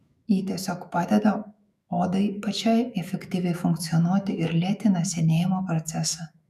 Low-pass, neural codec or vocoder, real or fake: 14.4 kHz; autoencoder, 48 kHz, 128 numbers a frame, DAC-VAE, trained on Japanese speech; fake